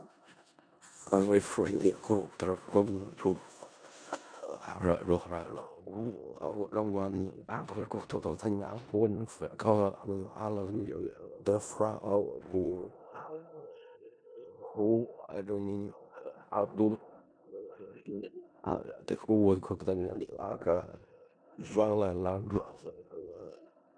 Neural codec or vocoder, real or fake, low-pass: codec, 16 kHz in and 24 kHz out, 0.4 kbps, LongCat-Audio-Codec, four codebook decoder; fake; 9.9 kHz